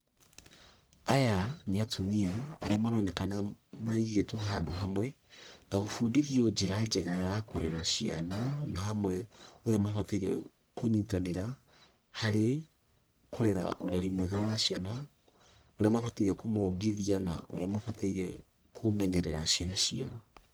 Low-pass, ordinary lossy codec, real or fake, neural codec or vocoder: none; none; fake; codec, 44.1 kHz, 1.7 kbps, Pupu-Codec